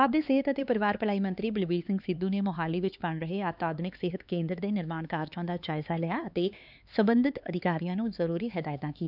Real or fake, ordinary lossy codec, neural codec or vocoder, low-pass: fake; none; codec, 16 kHz, 4 kbps, X-Codec, HuBERT features, trained on LibriSpeech; 5.4 kHz